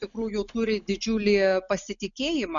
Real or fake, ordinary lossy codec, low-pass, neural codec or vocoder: real; MP3, 96 kbps; 7.2 kHz; none